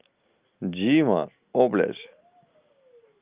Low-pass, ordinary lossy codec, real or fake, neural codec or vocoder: 3.6 kHz; Opus, 24 kbps; real; none